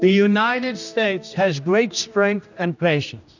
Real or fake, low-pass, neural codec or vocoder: fake; 7.2 kHz; codec, 16 kHz, 1 kbps, X-Codec, HuBERT features, trained on general audio